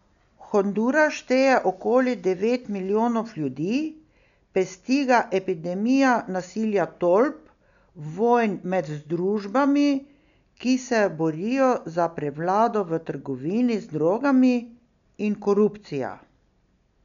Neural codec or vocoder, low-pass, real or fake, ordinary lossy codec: none; 7.2 kHz; real; none